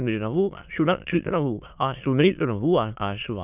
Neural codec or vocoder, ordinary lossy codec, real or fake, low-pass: autoencoder, 22.05 kHz, a latent of 192 numbers a frame, VITS, trained on many speakers; none; fake; 3.6 kHz